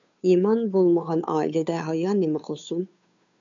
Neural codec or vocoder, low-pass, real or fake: codec, 16 kHz, 2 kbps, FunCodec, trained on Chinese and English, 25 frames a second; 7.2 kHz; fake